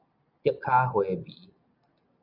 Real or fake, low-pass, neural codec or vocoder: real; 5.4 kHz; none